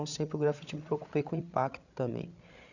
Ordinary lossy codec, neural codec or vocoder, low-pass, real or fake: none; codec, 16 kHz, 16 kbps, FreqCodec, larger model; 7.2 kHz; fake